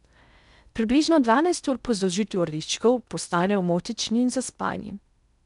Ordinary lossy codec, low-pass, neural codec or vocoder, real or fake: none; 10.8 kHz; codec, 16 kHz in and 24 kHz out, 0.6 kbps, FocalCodec, streaming, 2048 codes; fake